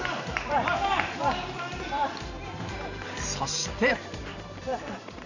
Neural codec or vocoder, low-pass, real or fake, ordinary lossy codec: vocoder, 44.1 kHz, 128 mel bands every 512 samples, BigVGAN v2; 7.2 kHz; fake; none